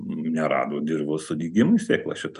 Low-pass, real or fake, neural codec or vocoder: 10.8 kHz; real; none